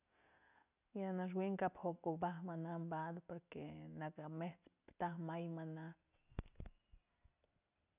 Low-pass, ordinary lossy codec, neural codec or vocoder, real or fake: 3.6 kHz; none; none; real